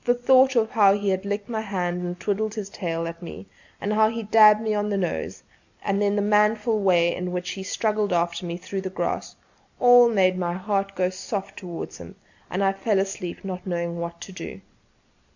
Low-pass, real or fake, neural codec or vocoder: 7.2 kHz; real; none